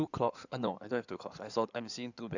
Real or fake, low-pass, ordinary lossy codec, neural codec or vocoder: fake; 7.2 kHz; none; codec, 16 kHz in and 24 kHz out, 2.2 kbps, FireRedTTS-2 codec